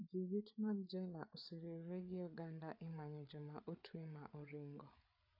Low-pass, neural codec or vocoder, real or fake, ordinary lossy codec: 5.4 kHz; codec, 16 kHz, 8 kbps, FreqCodec, larger model; fake; AAC, 32 kbps